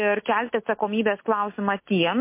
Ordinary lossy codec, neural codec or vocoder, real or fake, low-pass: MP3, 24 kbps; none; real; 3.6 kHz